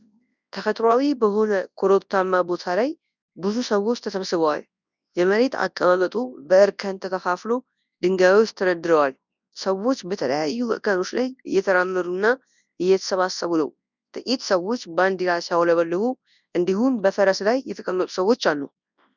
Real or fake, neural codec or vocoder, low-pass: fake; codec, 24 kHz, 0.9 kbps, WavTokenizer, large speech release; 7.2 kHz